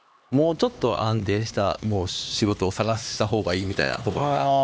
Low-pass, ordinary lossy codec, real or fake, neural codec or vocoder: none; none; fake; codec, 16 kHz, 2 kbps, X-Codec, HuBERT features, trained on LibriSpeech